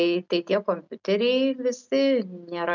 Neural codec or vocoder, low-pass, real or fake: none; 7.2 kHz; real